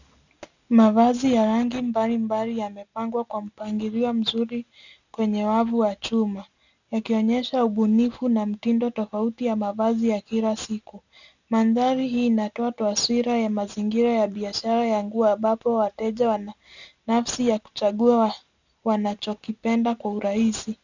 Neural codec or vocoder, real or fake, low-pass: none; real; 7.2 kHz